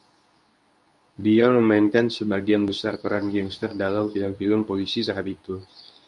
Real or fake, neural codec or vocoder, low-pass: fake; codec, 24 kHz, 0.9 kbps, WavTokenizer, medium speech release version 2; 10.8 kHz